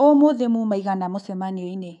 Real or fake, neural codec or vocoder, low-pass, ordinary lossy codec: fake; codec, 24 kHz, 3.1 kbps, DualCodec; 10.8 kHz; none